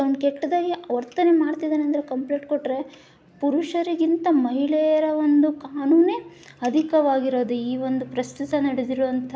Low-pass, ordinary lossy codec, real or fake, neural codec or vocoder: none; none; real; none